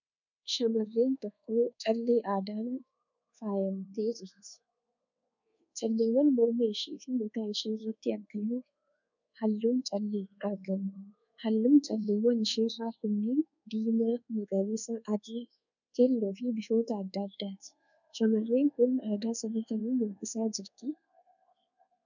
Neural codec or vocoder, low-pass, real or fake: codec, 24 kHz, 1.2 kbps, DualCodec; 7.2 kHz; fake